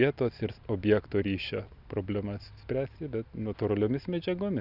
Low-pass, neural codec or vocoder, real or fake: 5.4 kHz; none; real